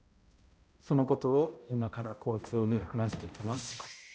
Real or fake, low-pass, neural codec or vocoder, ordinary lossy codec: fake; none; codec, 16 kHz, 0.5 kbps, X-Codec, HuBERT features, trained on balanced general audio; none